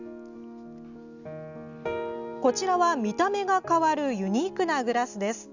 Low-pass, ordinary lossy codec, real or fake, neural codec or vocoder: 7.2 kHz; none; real; none